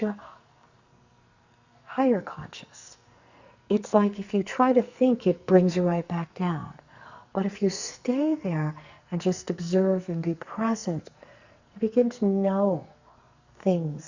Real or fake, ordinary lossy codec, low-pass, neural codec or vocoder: fake; Opus, 64 kbps; 7.2 kHz; codec, 44.1 kHz, 2.6 kbps, SNAC